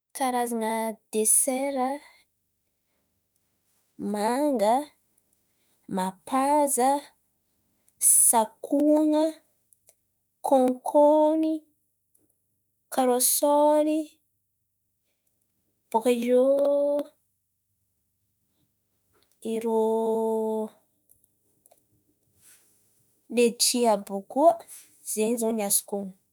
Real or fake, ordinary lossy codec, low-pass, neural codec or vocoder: fake; none; none; autoencoder, 48 kHz, 32 numbers a frame, DAC-VAE, trained on Japanese speech